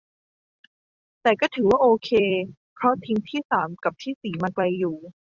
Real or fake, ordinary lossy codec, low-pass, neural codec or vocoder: fake; Opus, 64 kbps; 7.2 kHz; vocoder, 44.1 kHz, 128 mel bands every 256 samples, BigVGAN v2